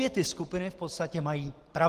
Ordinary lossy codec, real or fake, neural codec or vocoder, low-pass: Opus, 16 kbps; real; none; 14.4 kHz